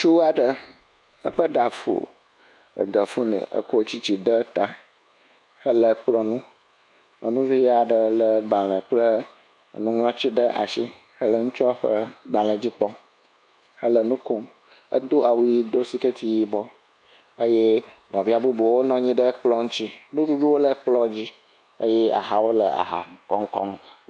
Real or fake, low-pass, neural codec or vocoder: fake; 10.8 kHz; codec, 24 kHz, 1.2 kbps, DualCodec